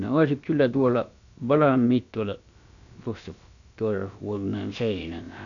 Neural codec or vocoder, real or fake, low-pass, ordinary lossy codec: codec, 16 kHz, about 1 kbps, DyCAST, with the encoder's durations; fake; 7.2 kHz; none